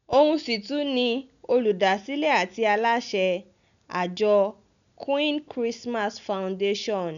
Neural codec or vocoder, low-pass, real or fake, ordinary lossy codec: none; 7.2 kHz; real; none